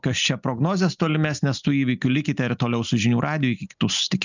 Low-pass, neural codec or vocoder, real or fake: 7.2 kHz; none; real